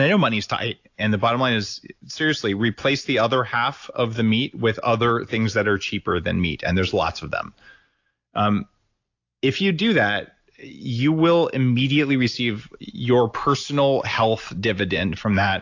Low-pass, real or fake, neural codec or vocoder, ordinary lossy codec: 7.2 kHz; real; none; AAC, 48 kbps